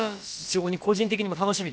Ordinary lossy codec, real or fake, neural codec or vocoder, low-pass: none; fake; codec, 16 kHz, about 1 kbps, DyCAST, with the encoder's durations; none